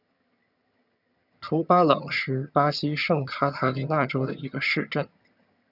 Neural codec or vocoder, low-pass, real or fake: vocoder, 22.05 kHz, 80 mel bands, HiFi-GAN; 5.4 kHz; fake